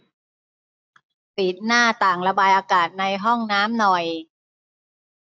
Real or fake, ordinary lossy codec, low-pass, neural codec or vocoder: real; none; none; none